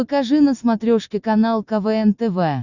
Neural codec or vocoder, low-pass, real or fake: none; 7.2 kHz; real